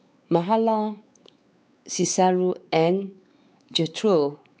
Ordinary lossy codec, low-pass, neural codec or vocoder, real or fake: none; none; codec, 16 kHz, 4 kbps, X-Codec, WavLM features, trained on Multilingual LibriSpeech; fake